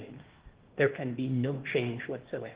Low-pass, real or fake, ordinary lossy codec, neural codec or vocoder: 3.6 kHz; fake; Opus, 16 kbps; codec, 16 kHz, 0.8 kbps, ZipCodec